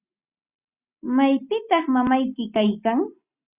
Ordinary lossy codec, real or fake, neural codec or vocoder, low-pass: Opus, 64 kbps; real; none; 3.6 kHz